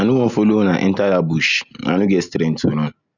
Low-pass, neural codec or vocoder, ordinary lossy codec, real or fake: 7.2 kHz; none; none; real